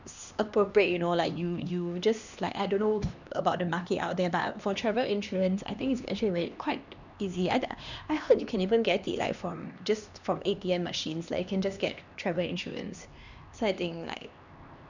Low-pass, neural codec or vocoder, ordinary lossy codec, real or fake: 7.2 kHz; codec, 16 kHz, 2 kbps, X-Codec, HuBERT features, trained on LibriSpeech; none; fake